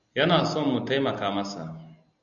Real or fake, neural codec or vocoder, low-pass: real; none; 7.2 kHz